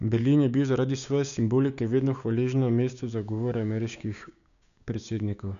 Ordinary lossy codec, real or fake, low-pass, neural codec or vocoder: none; fake; 7.2 kHz; codec, 16 kHz, 6 kbps, DAC